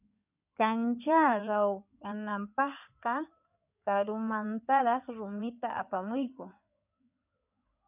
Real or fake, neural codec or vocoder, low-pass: fake; codec, 16 kHz in and 24 kHz out, 2.2 kbps, FireRedTTS-2 codec; 3.6 kHz